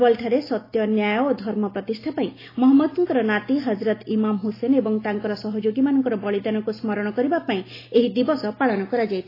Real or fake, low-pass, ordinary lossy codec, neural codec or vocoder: real; 5.4 kHz; AAC, 24 kbps; none